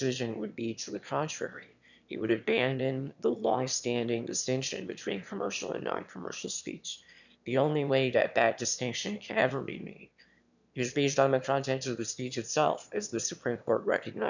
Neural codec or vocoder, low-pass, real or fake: autoencoder, 22.05 kHz, a latent of 192 numbers a frame, VITS, trained on one speaker; 7.2 kHz; fake